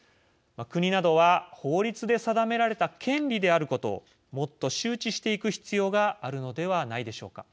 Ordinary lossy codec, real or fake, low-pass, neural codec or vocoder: none; real; none; none